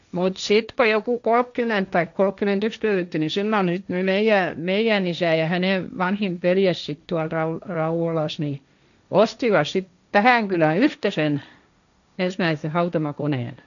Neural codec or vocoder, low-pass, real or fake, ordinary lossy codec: codec, 16 kHz, 1.1 kbps, Voila-Tokenizer; 7.2 kHz; fake; none